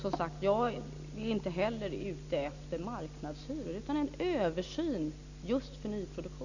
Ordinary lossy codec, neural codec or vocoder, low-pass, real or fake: none; none; 7.2 kHz; real